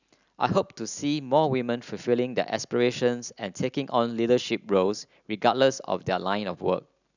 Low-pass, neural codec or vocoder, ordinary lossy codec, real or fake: 7.2 kHz; none; none; real